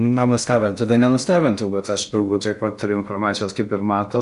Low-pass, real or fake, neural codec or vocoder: 10.8 kHz; fake; codec, 16 kHz in and 24 kHz out, 0.6 kbps, FocalCodec, streaming, 4096 codes